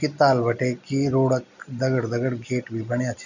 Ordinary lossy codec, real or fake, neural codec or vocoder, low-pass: none; real; none; 7.2 kHz